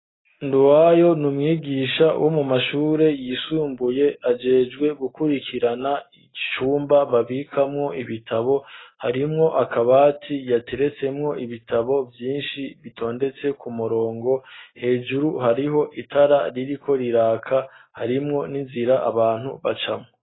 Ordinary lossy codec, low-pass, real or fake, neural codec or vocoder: AAC, 16 kbps; 7.2 kHz; real; none